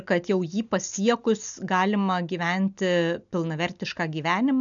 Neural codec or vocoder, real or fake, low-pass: none; real; 7.2 kHz